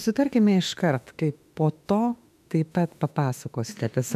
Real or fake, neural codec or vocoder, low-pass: fake; autoencoder, 48 kHz, 32 numbers a frame, DAC-VAE, trained on Japanese speech; 14.4 kHz